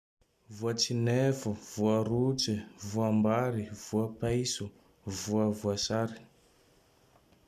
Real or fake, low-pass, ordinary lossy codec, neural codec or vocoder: real; 14.4 kHz; none; none